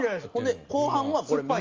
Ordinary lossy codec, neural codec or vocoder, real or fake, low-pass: Opus, 32 kbps; none; real; 7.2 kHz